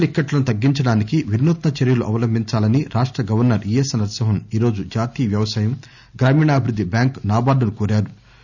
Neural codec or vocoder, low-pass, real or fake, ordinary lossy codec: none; 7.2 kHz; real; none